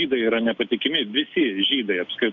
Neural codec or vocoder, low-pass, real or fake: none; 7.2 kHz; real